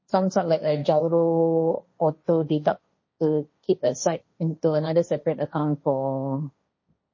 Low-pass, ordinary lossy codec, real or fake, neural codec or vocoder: 7.2 kHz; MP3, 32 kbps; fake; codec, 16 kHz, 1.1 kbps, Voila-Tokenizer